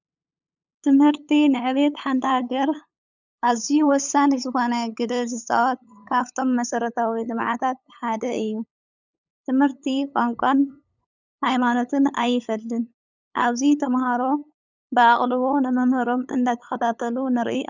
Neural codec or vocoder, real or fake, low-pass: codec, 16 kHz, 8 kbps, FunCodec, trained on LibriTTS, 25 frames a second; fake; 7.2 kHz